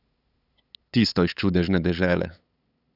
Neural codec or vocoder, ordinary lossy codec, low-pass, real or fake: codec, 16 kHz, 8 kbps, FunCodec, trained on LibriTTS, 25 frames a second; none; 5.4 kHz; fake